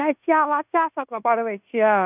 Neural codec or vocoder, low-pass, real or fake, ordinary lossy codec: codec, 16 kHz in and 24 kHz out, 0.9 kbps, LongCat-Audio-Codec, fine tuned four codebook decoder; 3.6 kHz; fake; none